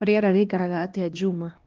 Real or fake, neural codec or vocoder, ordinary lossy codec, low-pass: fake; codec, 16 kHz, 1 kbps, X-Codec, HuBERT features, trained on LibriSpeech; Opus, 16 kbps; 7.2 kHz